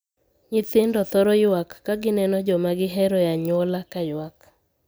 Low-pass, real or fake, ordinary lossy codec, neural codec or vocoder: none; real; none; none